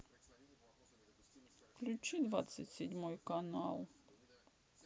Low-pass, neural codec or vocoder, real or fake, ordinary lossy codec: none; none; real; none